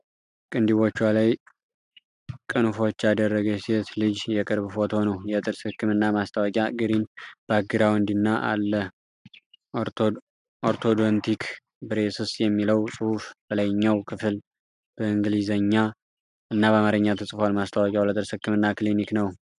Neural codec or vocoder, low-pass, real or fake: none; 10.8 kHz; real